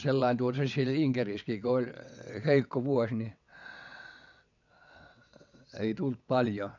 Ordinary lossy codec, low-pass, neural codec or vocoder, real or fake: none; 7.2 kHz; vocoder, 22.05 kHz, 80 mel bands, WaveNeXt; fake